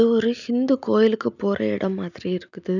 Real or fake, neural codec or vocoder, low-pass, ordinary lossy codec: real; none; 7.2 kHz; none